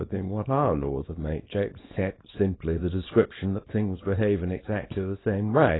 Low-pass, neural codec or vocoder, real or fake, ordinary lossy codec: 7.2 kHz; codec, 24 kHz, 0.9 kbps, WavTokenizer, small release; fake; AAC, 16 kbps